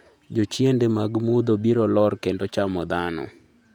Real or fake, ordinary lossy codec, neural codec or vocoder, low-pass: fake; none; vocoder, 48 kHz, 128 mel bands, Vocos; 19.8 kHz